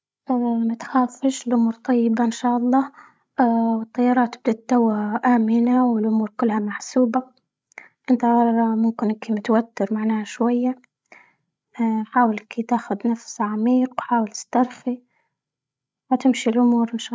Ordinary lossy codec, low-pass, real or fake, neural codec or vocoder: none; none; fake; codec, 16 kHz, 16 kbps, FreqCodec, larger model